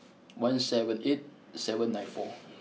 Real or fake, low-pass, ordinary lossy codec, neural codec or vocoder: real; none; none; none